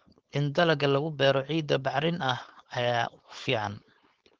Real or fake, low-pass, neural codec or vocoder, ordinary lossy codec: fake; 7.2 kHz; codec, 16 kHz, 4.8 kbps, FACodec; Opus, 16 kbps